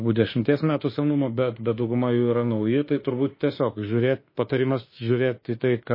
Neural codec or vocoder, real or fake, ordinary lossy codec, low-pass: autoencoder, 48 kHz, 32 numbers a frame, DAC-VAE, trained on Japanese speech; fake; MP3, 24 kbps; 5.4 kHz